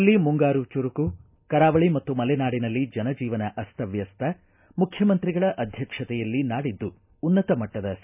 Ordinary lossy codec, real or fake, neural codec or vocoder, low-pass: none; real; none; 3.6 kHz